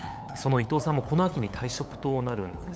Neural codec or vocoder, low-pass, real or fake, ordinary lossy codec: codec, 16 kHz, 8 kbps, FunCodec, trained on LibriTTS, 25 frames a second; none; fake; none